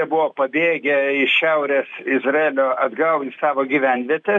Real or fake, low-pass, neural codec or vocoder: real; 9.9 kHz; none